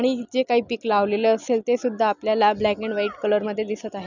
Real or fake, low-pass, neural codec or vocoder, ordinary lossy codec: real; 7.2 kHz; none; none